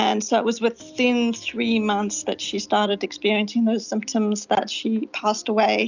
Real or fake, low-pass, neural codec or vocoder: real; 7.2 kHz; none